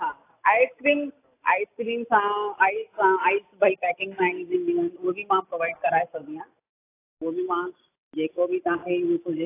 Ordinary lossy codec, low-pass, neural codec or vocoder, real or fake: AAC, 24 kbps; 3.6 kHz; none; real